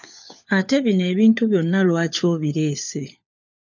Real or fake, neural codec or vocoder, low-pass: fake; codec, 16 kHz, 4 kbps, FunCodec, trained on LibriTTS, 50 frames a second; 7.2 kHz